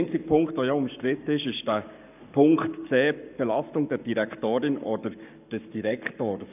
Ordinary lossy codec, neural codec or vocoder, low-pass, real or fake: none; codec, 44.1 kHz, 7.8 kbps, Pupu-Codec; 3.6 kHz; fake